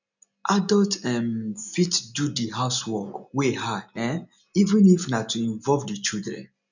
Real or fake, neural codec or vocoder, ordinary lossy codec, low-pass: real; none; none; 7.2 kHz